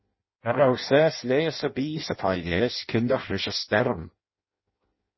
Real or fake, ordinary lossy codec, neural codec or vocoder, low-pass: fake; MP3, 24 kbps; codec, 16 kHz in and 24 kHz out, 0.6 kbps, FireRedTTS-2 codec; 7.2 kHz